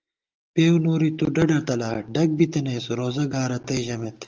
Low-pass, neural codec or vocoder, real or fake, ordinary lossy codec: 7.2 kHz; none; real; Opus, 24 kbps